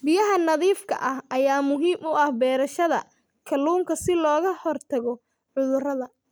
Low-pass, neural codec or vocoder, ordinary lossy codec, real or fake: none; none; none; real